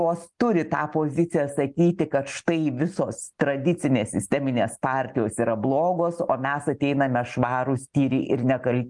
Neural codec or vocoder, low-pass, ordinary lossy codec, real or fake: none; 10.8 kHz; Opus, 32 kbps; real